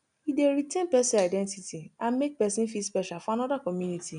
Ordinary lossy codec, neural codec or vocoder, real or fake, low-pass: none; none; real; 9.9 kHz